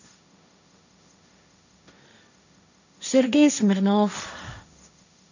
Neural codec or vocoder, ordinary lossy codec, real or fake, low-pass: codec, 16 kHz, 1.1 kbps, Voila-Tokenizer; none; fake; 7.2 kHz